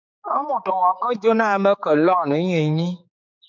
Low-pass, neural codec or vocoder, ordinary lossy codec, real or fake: 7.2 kHz; codec, 16 kHz, 4 kbps, X-Codec, HuBERT features, trained on general audio; MP3, 48 kbps; fake